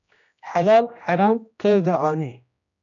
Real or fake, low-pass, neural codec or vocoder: fake; 7.2 kHz; codec, 16 kHz, 1 kbps, X-Codec, HuBERT features, trained on general audio